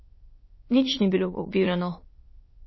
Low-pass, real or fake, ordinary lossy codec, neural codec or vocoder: 7.2 kHz; fake; MP3, 24 kbps; autoencoder, 22.05 kHz, a latent of 192 numbers a frame, VITS, trained on many speakers